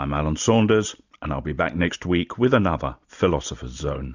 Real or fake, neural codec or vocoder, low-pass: real; none; 7.2 kHz